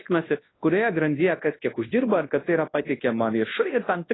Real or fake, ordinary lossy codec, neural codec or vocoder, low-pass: fake; AAC, 16 kbps; codec, 24 kHz, 0.9 kbps, WavTokenizer, large speech release; 7.2 kHz